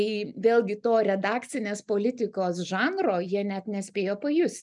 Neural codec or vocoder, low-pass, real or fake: none; 10.8 kHz; real